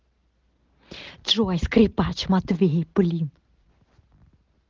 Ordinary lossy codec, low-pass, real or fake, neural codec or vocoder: Opus, 16 kbps; 7.2 kHz; real; none